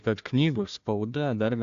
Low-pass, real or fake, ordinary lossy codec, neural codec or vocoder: 7.2 kHz; fake; AAC, 64 kbps; codec, 16 kHz, 1 kbps, FunCodec, trained on Chinese and English, 50 frames a second